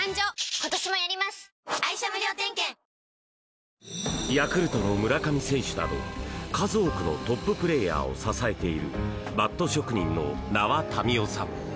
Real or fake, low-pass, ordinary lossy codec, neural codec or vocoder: real; none; none; none